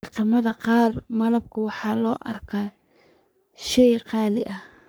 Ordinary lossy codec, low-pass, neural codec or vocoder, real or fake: none; none; codec, 44.1 kHz, 3.4 kbps, Pupu-Codec; fake